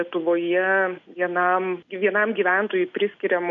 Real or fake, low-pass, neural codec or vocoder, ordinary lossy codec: real; 7.2 kHz; none; MP3, 64 kbps